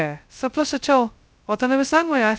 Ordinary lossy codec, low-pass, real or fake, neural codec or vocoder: none; none; fake; codec, 16 kHz, 0.2 kbps, FocalCodec